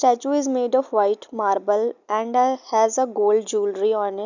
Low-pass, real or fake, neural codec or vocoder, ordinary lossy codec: 7.2 kHz; real; none; none